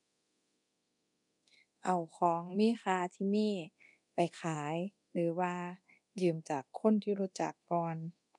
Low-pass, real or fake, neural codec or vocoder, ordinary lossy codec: 10.8 kHz; fake; codec, 24 kHz, 0.9 kbps, DualCodec; none